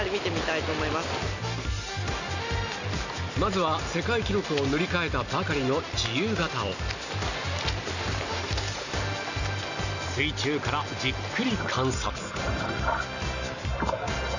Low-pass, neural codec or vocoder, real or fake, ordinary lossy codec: 7.2 kHz; none; real; none